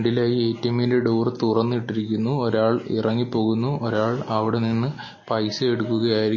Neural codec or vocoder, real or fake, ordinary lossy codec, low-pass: none; real; MP3, 32 kbps; 7.2 kHz